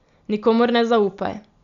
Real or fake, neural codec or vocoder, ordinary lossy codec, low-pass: real; none; AAC, 96 kbps; 7.2 kHz